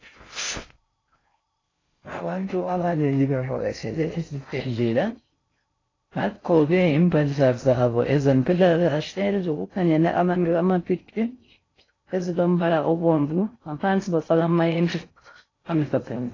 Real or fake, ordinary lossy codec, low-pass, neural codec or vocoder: fake; AAC, 32 kbps; 7.2 kHz; codec, 16 kHz in and 24 kHz out, 0.6 kbps, FocalCodec, streaming, 4096 codes